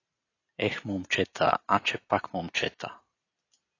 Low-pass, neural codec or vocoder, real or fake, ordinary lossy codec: 7.2 kHz; none; real; AAC, 32 kbps